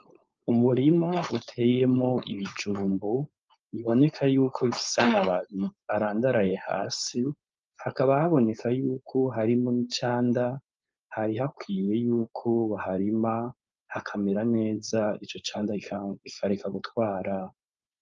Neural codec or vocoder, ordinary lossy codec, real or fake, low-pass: codec, 16 kHz, 4.8 kbps, FACodec; Opus, 24 kbps; fake; 7.2 kHz